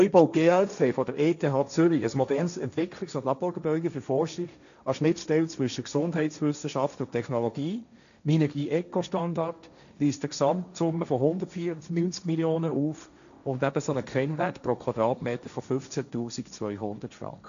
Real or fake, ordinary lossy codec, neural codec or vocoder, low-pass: fake; none; codec, 16 kHz, 1.1 kbps, Voila-Tokenizer; 7.2 kHz